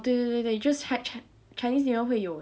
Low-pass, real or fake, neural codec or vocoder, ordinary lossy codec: none; real; none; none